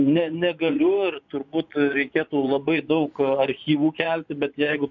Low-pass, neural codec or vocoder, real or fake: 7.2 kHz; vocoder, 24 kHz, 100 mel bands, Vocos; fake